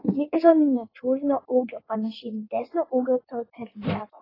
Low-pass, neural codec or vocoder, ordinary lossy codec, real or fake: 5.4 kHz; codec, 16 kHz in and 24 kHz out, 1.1 kbps, FireRedTTS-2 codec; AAC, 24 kbps; fake